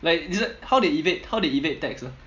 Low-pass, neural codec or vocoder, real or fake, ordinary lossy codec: 7.2 kHz; none; real; MP3, 64 kbps